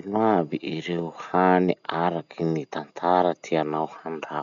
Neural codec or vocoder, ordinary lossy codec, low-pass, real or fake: none; none; 7.2 kHz; real